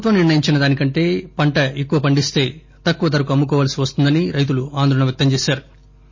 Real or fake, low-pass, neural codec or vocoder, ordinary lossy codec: real; 7.2 kHz; none; MP3, 32 kbps